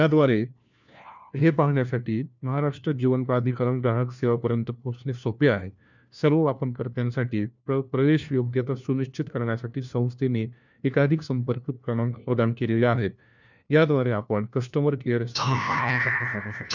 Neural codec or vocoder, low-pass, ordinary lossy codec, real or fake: codec, 16 kHz, 1 kbps, FunCodec, trained on LibriTTS, 50 frames a second; 7.2 kHz; none; fake